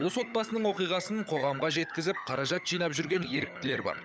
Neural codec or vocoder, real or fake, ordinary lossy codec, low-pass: codec, 16 kHz, 16 kbps, FunCodec, trained on LibriTTS, 50 frames a second; fake; none; none